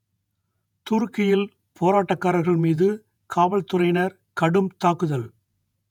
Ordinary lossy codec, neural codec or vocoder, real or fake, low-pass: none; vocoder, 48 kHz, 128 mel bands, Vocos; fake; 19.8 kHz